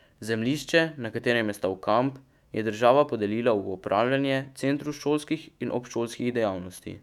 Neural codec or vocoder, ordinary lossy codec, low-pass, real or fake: autoencoder, 48 kHz, 128 numbers a frame, DAC-VAE, trained on Japanese speech; none; 19.8 kHz; fake